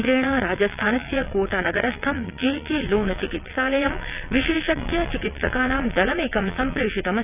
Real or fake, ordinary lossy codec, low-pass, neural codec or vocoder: fake; none; 3.6 kHz; vocoder, 22.05 kHz, 80 mel bands, WaveNeXt